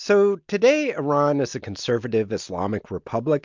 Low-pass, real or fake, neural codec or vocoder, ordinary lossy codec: 7.2 kHz; real; none; MP3, 64 kbps